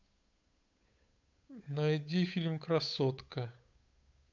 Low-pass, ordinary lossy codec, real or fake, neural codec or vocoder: 7.2 kHz; MP3, 64 kbps; fake; codec, 16 kHz, 8 kbps, FunCodec, trained on Chinese and English, 25 frames a second